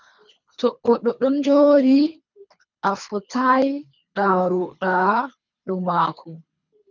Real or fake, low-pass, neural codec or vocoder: fake; 7.2 kHz; codec, 24 kHz, 3 kbps, HILCodec